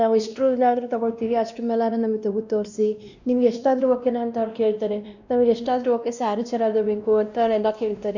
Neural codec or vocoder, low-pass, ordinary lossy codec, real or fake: codec, 16 kHz, 1 kbps, X-Codec, WavLM features, trained on Multilingual LibriSpeech; 7.2 kHz; none; fake